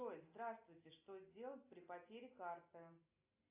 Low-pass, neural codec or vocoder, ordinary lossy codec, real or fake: 3.6 kHz; none; AAC, 32 kbps; real